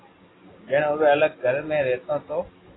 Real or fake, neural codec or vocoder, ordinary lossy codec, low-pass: real; none; AAC, 16 kbps; 7.2 kHz